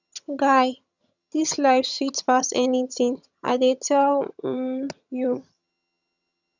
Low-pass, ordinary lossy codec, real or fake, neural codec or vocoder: 7.2 kHz; none; fake; vocoder, 22.05 kHz, 80 mel bands, HiFi-GAN